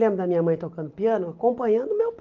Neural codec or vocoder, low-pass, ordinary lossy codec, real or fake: none; 7.2 kHz; Opus, 32 kbps; real